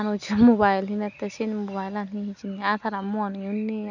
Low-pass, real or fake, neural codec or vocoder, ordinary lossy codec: 7.2 kHz; real; none; none